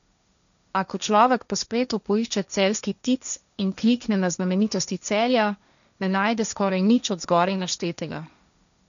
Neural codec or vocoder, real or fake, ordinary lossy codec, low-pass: codec, 16 kHz, 1.1 kbps, Voila-Tokenizer; fake; none; 7.2 kHz